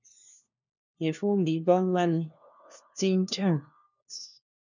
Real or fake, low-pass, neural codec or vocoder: fake; 7.2 kHz; codec, 16 kHz, 1 kbps, FunCodec, trained on LibriTTS, 50 frames a second